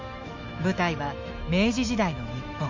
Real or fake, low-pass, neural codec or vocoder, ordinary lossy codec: fake; 7.2 kHz; autoencoder, 48 kHz, 128 numbers a frame, DAC-VAE, trained on Japanese speech; MP3, 48 kbps